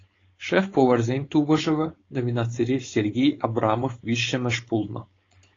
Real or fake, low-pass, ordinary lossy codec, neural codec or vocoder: fake; 7.2 kHz; AAC, 32 kbps; codec, 16 kHz, 4.8 kbps, FACodec